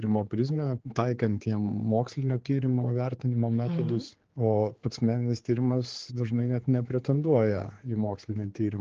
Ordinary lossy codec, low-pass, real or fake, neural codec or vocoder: Opus, 16 kbps; 7.2 kHz; fake; codec, 16 kHz, 4 kbps, X-Codec, HuBERT features, trained on general audio